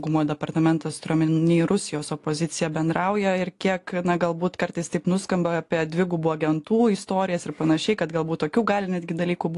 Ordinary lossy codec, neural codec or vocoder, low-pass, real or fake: AAC, 48 kbps; none; 10.8 kHz; real